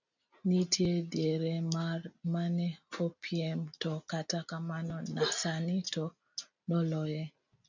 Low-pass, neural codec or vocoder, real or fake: 7.2 kHz; none; real